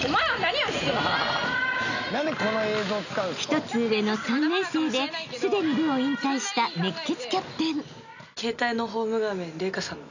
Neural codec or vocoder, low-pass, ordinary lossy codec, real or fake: none; 7.2 kHz; none; real